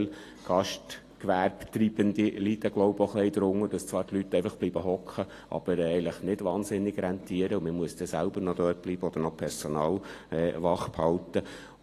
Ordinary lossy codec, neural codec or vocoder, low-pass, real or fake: AAC, 48 kbps; none; 14.4 kHz; real